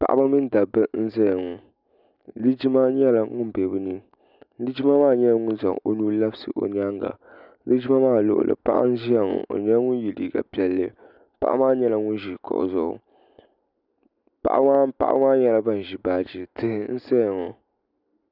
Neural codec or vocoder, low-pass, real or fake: none; 5.4 kHz; real